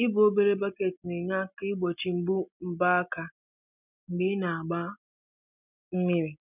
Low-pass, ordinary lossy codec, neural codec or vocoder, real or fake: 3.6 kHz; none; none; real